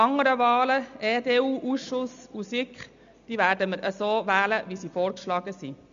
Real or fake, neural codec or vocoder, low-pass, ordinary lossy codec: real; none; 7.2 kHz; MP3, 64 kbps